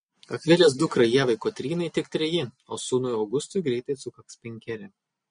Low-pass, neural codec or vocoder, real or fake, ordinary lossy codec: 9.9 kHz; none; real; MP3, 48 kbps